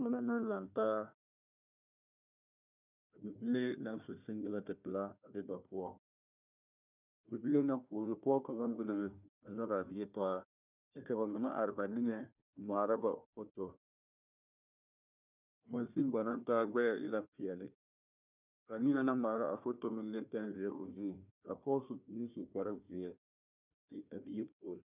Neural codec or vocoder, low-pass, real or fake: codec, 16 kHz, 1 kbps, FunCodec, trained on Chinese and English, 50 frames a second; 3.6 kHz; fake